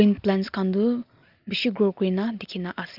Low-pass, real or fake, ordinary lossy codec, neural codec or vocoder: 5.4 kHz; real; Opus, 24 kbps; none